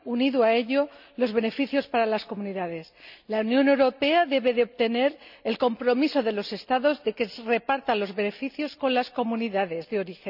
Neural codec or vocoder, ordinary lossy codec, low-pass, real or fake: none; none; 5.4 kHz; real